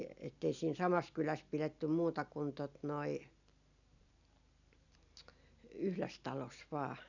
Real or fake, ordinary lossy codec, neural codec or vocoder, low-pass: real; none; none; 7.2 kHz